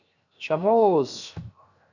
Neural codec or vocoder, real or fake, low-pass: codec, 16 kHz, 0.7 kbps, FocalCodec; fake; 7.2 kHz